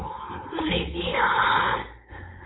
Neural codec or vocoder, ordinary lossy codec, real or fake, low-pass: codec, 16 kHz, 4.8 kbps, FACodec; AAC, 16 kbps; fake; 7.2 kHz